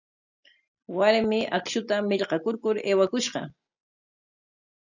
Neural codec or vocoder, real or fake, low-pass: none; real; 7.2 kHz